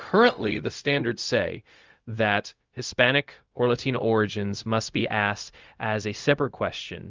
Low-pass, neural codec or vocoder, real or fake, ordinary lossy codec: 7.2 kHz; codec, 16 kHz, 0.4 kbps, LongCat-Audio-Codec; fake; Opus, 24 kbps